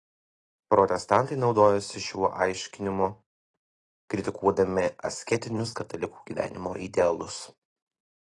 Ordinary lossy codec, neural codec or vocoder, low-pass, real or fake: AAC, 32 kbps; none; 10.8 kHz; real